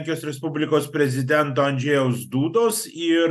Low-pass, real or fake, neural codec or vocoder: 14.4 kHz; real; none